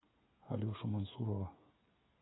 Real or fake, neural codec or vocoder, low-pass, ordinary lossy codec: fake; codec, 16 kHz, 8 kbps, FreqCodec, smaller model; 7.2 kHz; AAC, 16 kbps